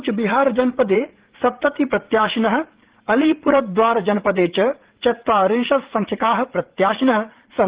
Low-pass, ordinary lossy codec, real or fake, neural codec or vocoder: 3.6 kHz; Opus, 16 kbps; fake; vocoder, 44.1 kHz, 80 mel bands, Vocos